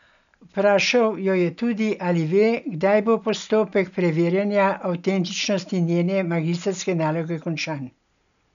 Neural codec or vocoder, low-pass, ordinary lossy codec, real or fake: none; 7.2 kHz; MP3, 96 kbps; real